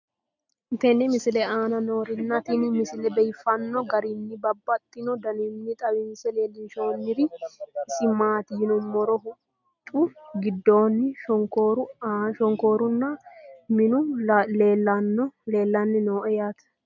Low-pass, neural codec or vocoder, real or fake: 7.2 kHz; none; real